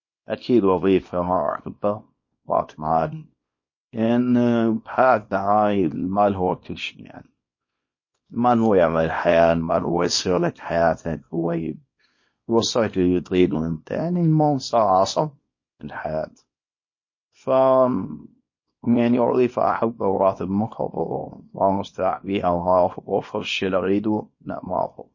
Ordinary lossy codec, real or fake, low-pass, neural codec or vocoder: MP3, 32 kbps; fake; 7.2 kHz; codec, 24 kHz, 0.9 kbps, WavTokenizer, small release